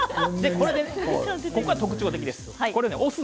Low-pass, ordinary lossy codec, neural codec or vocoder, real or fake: none; none; none; real